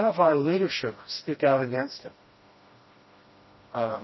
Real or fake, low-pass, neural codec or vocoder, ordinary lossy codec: fake; 7.2 kHz; codec, 16 kHz, 1 kbps, FreqCodec, smaller model; MP3, 24 kbps